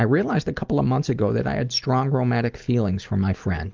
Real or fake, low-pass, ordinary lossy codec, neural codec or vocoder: real; 7.2 kHz; Opus, 32 kbps; none